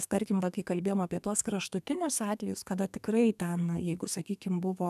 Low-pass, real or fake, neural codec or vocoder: 14.4 kHz; fake; codec, 32 kHz, 1.9 kbps, SNAC